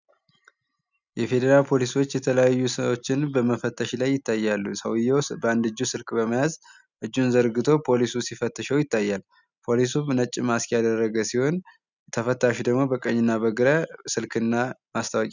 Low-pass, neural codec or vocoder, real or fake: 7.2 kHz; none; real